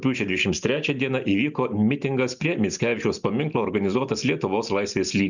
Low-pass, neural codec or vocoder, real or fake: 7.2 kHz; none; real